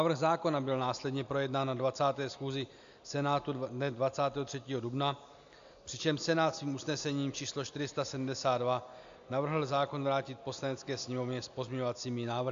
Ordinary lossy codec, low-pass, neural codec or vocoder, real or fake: AAC, 64 kbps; 7.2 kHz; none; real